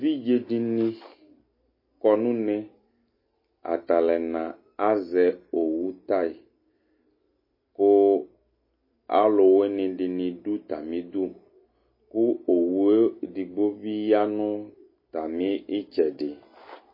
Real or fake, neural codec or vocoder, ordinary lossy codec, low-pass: real; none; MP3, 24 kbps; 5.4 kHz